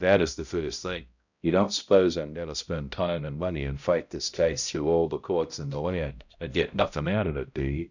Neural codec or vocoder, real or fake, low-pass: codec, 16 kHz, 0.5 kbps, X-Codec, HuBERT features, trained on balanced general audio; fake; 7.2 kHz